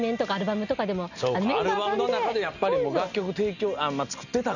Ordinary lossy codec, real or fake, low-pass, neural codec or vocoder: none; real; 7.2 kHz; none